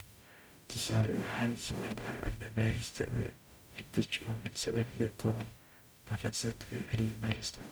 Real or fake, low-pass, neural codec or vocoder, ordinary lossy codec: fake; none; codec, 44.1 kHz, 0.9 kbps, DAC; none